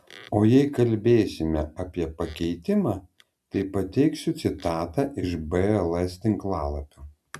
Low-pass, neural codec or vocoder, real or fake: 14.4 kHz; none; real